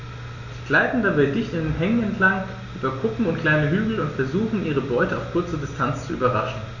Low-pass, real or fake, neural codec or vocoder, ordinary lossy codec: 7.2 kHz; real; none; none